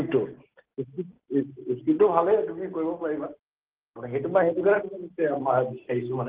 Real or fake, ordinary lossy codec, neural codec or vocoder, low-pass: real; Opus, 16 kbps; none; 3.6 kHz